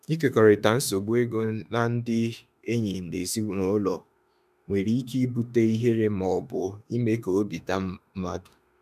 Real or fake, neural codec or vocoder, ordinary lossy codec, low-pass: fake; autoencoder, 48 kHz, 32 numbers a frame, DAC-VAE, trained on Japanese speech; none; 14.4 kHz